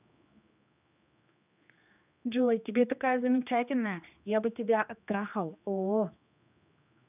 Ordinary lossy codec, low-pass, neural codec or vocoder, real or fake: none; 3.6 kHz; codec, 16 kHz, 2 kbps, X-Codec, HuBERT features, trained on general audio; fake